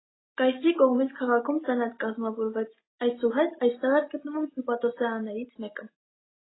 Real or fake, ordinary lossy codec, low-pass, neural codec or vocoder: real; AAC, 16 kbps; 7.2 kHz; none